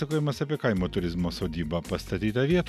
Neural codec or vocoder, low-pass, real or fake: none; 14.4 kHz; real